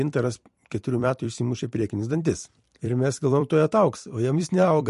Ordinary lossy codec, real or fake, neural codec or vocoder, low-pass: MP3, 48 kbps; fake; vocoder, 44.1 kHz, 128 mel bands every 256 samples, BigVGAN v2; 14.4 kHz